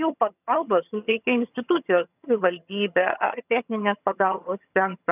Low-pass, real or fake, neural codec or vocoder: 3.6 kHz; fake; vocoder, 22.05 kHz, 80 mel bands, HiFi-GAN